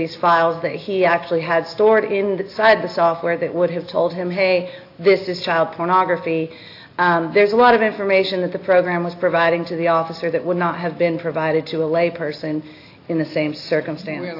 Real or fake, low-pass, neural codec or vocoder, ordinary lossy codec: real; 5.4 kHz; none; AAC, 32 kbps